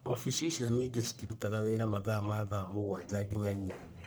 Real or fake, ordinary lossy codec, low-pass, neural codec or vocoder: fake; none; none; codec, 44.1 kHz, 1.7 kbps, Pupu-Codec